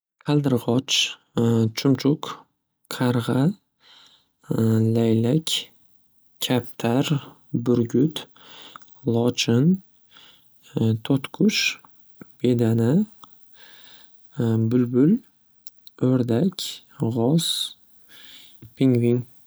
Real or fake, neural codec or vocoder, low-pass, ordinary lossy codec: real; none; none; none